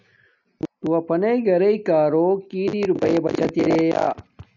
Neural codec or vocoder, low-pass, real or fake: none; 7.2 kHz; real